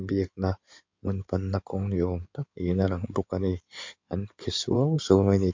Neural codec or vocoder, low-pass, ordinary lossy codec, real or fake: codec, 16 kHz in and 24 kHz out, 2.2 kbps, FireRedTTS-2 codec; 7.2 kHz; MP3, 48 kbps; fake